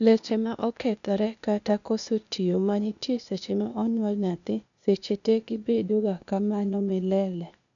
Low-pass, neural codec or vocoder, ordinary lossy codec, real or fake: 7.2 kHz; codec, 16 kHz, 0.8 kbps, ZipCodec; none; fake